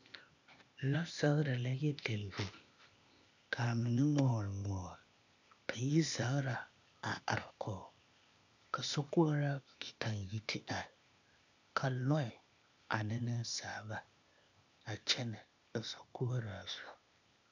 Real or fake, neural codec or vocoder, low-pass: fake; codec, 16 kHz, 0.8 kbps, ZipCodec; 7.2 kHz